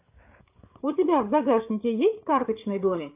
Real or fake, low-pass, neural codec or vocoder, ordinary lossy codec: fake; 3.6 kHz; codec, 16 kHz, 8 kbps, FreqCodec, larger model; AAC, 32 kbps